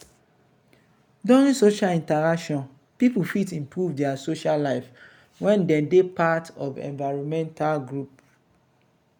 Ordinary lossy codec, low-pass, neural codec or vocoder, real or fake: none; 19.8 kHz; none; real